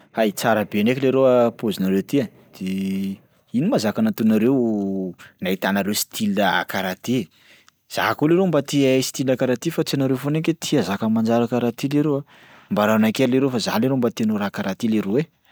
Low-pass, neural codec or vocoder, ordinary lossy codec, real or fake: none; none; none; real